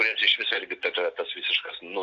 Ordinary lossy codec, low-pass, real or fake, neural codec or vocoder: AAC, 48 kbps; 7.2 kHz; real; none